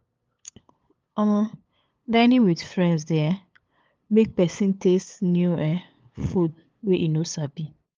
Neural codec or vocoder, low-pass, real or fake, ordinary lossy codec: codec, 16 kHz, 8 kbps, FunCodec, trained on LibriTTS, 25 frames a second; 7.2 kHz; fake; Opus, 32 kbps